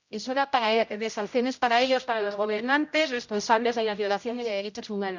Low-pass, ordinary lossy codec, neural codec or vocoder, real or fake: 7.2 kHz; none; codec, 16 kHz, 0.5 kbps, X-Codec, HuBERT features, trained on general audio; fake